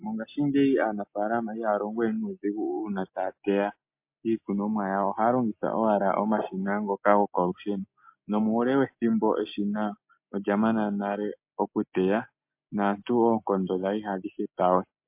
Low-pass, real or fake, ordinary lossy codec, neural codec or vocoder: 3.6 kHz; real; MP3, 32 kbps; none